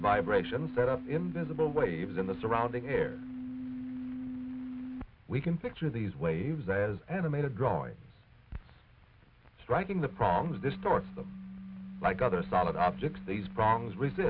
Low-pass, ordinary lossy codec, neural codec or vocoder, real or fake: 5.4 kHz; AAC, 48 kbps; none; real